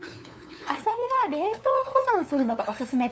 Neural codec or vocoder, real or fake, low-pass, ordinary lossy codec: codec, 16 kHz, 2 kbps, FunCodec, trained on LibriTTS, 25 frames a second; fake; none; none